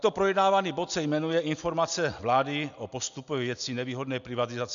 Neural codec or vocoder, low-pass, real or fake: none; 7.2 kHz; real